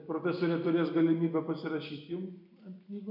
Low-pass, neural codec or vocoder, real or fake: 5.4 kHz; none; real